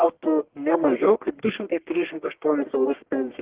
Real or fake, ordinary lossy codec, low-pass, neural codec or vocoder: fake; Opus, 16 kbps; 3.6 kHz; codec, 44.1 kHz, 1.7 kbps, Pupu-Codec